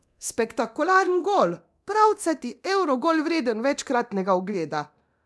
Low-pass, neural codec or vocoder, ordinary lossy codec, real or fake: none; codec, 24 kHz, 0.9 kbps, DualCodec; none; fake